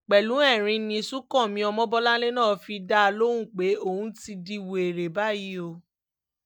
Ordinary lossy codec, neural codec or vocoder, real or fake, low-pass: none; none; real; 19.8 kHz